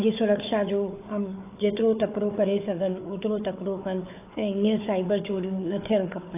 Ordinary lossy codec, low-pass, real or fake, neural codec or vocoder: AAC, 24 kbps; 3.6 kHz; fake; codec, 16 kHz, 4 kbps, FunCodec, trained on Chinese and English, 50 frames a second